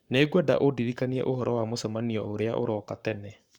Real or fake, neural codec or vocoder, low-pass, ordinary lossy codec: fake; codec, 44.1 kHz, 7.8 kbps, Pupu-Codec; 19.8 kHz; Opus, 64 kbps